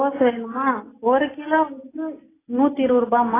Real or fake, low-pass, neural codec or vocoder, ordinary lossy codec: real; 3.6 kHz; none; AAC, 16 kbps